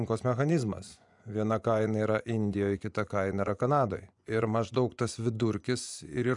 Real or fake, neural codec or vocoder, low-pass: fake; vocoder, 24 kHz, 100 mel bands, Vocos; 10.8 kHz